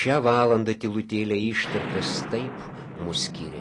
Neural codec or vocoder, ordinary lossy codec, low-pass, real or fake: none; AAC, 32 kbps; 10.8 kHz; real